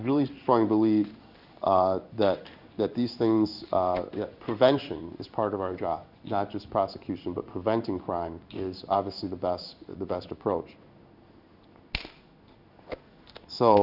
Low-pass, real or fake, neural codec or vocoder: 5.4 kHz; fake; codec, 16 kHz in and 24 kHz out, 1 kbps, XY-Tokenizer